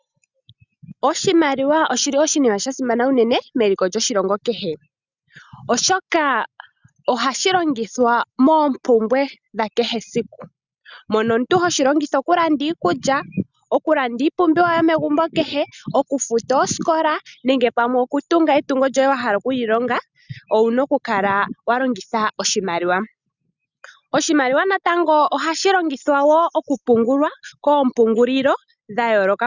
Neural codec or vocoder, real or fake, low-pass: none; real; 7.2 kHz